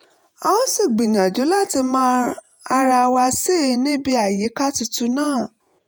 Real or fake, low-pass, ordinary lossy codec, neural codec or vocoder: fake; none; none; vocoder, 48 kHz, 128 mel bands, Vocos